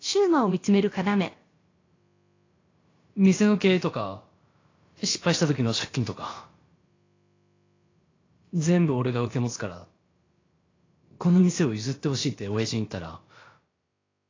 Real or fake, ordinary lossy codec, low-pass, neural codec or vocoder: fake; AAC, 32 kbps; 7.2 kHz; codec, 16 kHz, about 1 kbps, DyCAST, with the encoder's durations